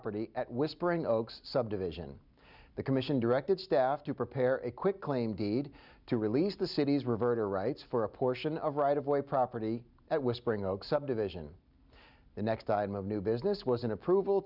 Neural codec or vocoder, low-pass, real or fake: none; 5.4 kHz; real